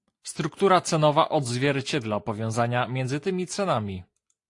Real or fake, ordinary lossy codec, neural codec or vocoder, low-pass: real; AAC, 48 kbps; none; 10.8 kHz